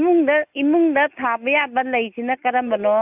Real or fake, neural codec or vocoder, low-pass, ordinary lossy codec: real; none; 3.6 kHz; AAC, 32 kbps